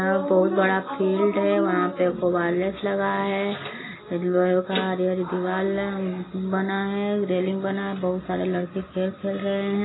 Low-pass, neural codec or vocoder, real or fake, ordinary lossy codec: 7.2 kHz; none; real; AAC, 16 kbps